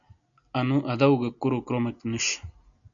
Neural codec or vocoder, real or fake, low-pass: none; real; 7.2 kHz